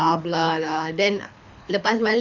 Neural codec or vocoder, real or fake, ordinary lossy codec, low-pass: codec, 24 kHz, 3 kbps, HILCodec; fake; none; 7.2 kHz